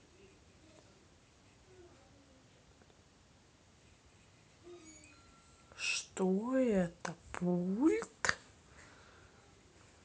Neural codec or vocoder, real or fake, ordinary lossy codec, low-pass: none; real; none; none